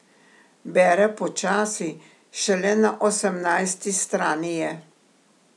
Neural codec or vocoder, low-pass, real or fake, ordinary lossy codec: none; none; real; none